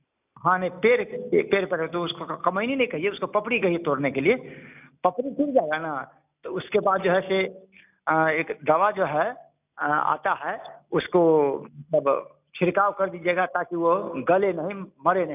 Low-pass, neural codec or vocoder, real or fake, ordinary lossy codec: 3.6 kHz; none; real; none